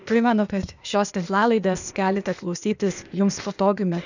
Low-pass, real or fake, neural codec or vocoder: 7.2 kHz; fake; codec, 16 kHz, 0.8 kbps, ZipCodec